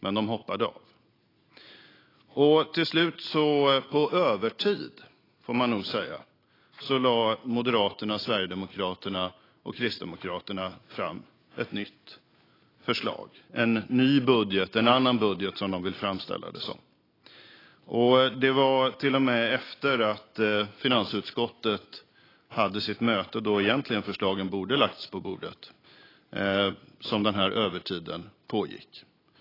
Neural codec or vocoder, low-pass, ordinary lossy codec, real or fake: none; 5.4 kHz; AAC, 24 kbps; real